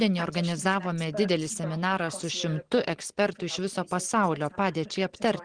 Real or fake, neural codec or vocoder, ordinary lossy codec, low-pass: real; none; Opus, 16 kbps; 9.9 kHz